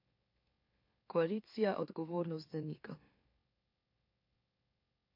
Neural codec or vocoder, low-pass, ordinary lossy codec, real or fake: autoencoder, 44.1 kHz, a latent of 192 numbers a frame, MeloTTS; 5.4 kHz; MP3, 32 kbps; fake